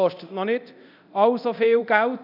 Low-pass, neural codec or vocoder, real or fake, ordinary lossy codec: 5.4 kHz; codec, 24 kHz, 0.9 kbps, DualCodec; fake; none